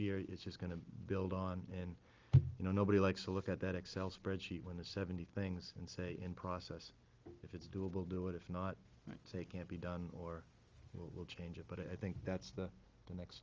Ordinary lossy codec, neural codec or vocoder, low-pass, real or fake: Opus, 32 kbps; none; 7.2 kHz; real